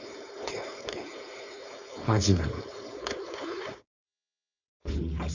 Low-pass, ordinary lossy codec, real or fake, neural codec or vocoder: 7.2 kHz; none; fake; codec, 16 kHz, 4.8 kbps, FACodec